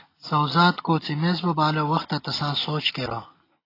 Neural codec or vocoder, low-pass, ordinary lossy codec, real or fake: none; 5.4 kHz; AAC, 24 kbps; real